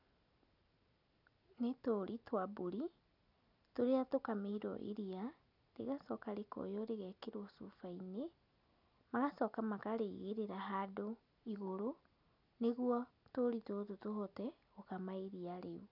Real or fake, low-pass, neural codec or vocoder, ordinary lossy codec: real; 5.4 kHz; none; none